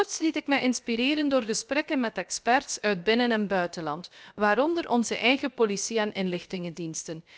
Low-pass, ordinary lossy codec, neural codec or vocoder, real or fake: none; none; codec, 16 kHz, about 1 kbps, DyCAST, with the encoder's durations; fake